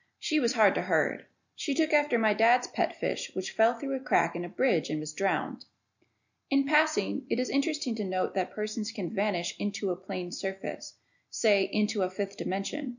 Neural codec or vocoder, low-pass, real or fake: none; 7.2 kHz; real